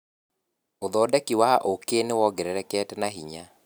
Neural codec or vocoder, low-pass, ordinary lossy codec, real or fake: none; none; none; real